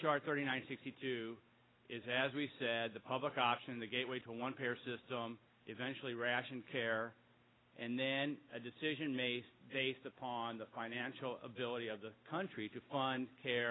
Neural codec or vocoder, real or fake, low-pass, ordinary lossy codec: none; real; 7.2 kHz; AAC, 16 kbps